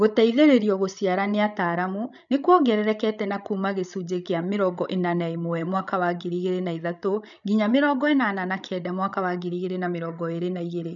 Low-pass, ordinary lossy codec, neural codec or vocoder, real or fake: 7.2 kHz; none; codec, 16 kHz, 16 kbps, FreqCodec, larger model; fake